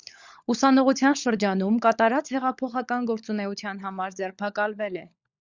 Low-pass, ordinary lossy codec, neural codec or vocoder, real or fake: 7.2 kHz; Opus, 64 kbps; codec, 16 kHz, 16 kbps, FunCodec, trained on LibriTTS, 50 frames a second; fake